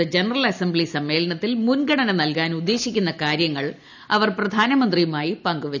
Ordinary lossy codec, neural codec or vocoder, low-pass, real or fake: none; none; 7.2 kHz; real